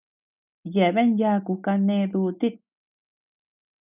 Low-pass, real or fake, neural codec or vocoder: 3.6 kHz; real; none